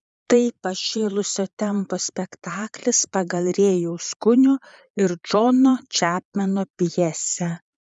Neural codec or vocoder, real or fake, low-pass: vocoder, 22.05 kHz, 80 mel bands, Vocos; fake; 9.9 kHz